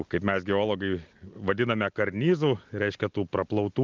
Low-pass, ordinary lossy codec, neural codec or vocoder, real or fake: 7.2 kHz; Opus, 24 kbps; none; real